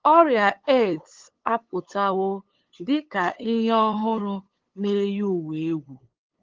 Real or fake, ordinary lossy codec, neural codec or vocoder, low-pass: fake; Opus, 16 kbps; codec, 16 kHz, 8 kbps, FunCodec, trained on LibriTTS, 25 frames a second; 7.2 kHz